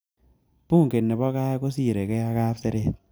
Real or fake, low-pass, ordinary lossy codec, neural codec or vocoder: real; none; none; none